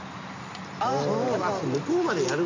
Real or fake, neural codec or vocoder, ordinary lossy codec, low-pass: real; none; MP3, 64 kbps; 7.2 kHz